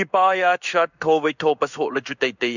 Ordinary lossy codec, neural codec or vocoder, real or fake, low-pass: none; codec, 16 kHz in and 24 kHz out, 1 kbps, XY-Tokenizer; fake; 7.2 kHz